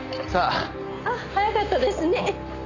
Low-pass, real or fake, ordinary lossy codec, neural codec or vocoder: 7.2 kHz; real; none; none